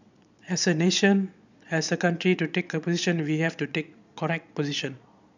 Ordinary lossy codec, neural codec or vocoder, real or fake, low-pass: none; vocoder, 22.05 kHz, 80 mel bands, Vocos; fake; 7.2 kHz